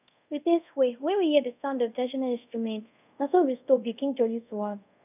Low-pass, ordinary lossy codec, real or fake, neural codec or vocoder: 3.6 kHz; none; fake; codec, 24 kHz, 0.5 kbps, DualCodec